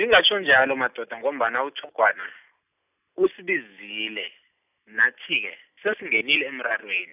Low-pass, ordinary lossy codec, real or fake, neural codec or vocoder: 3.6 kHz; none; real; none